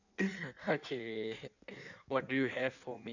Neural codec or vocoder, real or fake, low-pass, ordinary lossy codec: codec, 16 kHz in and 24 kHz out, 1.1 kbps, FireRedTTS-2 codec; fake; 7.2 kHz; none